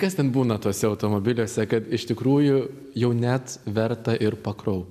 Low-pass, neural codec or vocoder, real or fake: 14.4 kHz; none; real